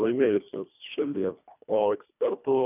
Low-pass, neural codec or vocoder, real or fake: 3.6 kHz; codec, 24 kHz, 1.5 kbps, HILCodec; fake